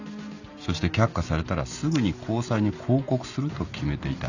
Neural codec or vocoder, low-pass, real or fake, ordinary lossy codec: none; 7.2 kHz; real; none